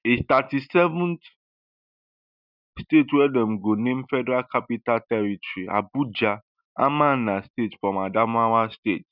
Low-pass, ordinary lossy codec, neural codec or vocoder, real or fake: 5.4 kHz; none; none; real